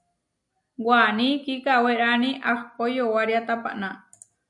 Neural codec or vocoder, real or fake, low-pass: none; real; 10.8 kHz